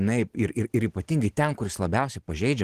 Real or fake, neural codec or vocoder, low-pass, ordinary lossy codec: real; none; 14.4 kHz; Opus, 16 kbps